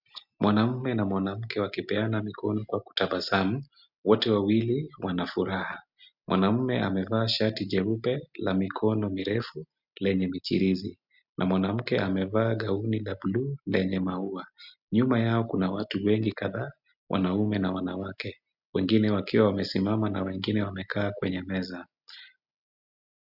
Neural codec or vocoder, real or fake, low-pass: none; real; 5.4 kHz